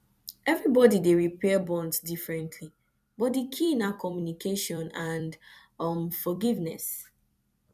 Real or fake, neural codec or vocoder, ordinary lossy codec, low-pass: fake; vocoder, 44.1 kHz, 128 mel bands every 256 samples, BigVGAN v2; none; 14.4 kHz